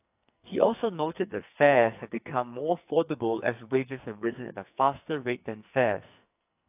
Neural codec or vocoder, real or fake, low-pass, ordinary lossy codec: codec, 44.1 kHz, 2.6 kbps, SNAC; fake; 3.6 kHz; AAC, 32 kbps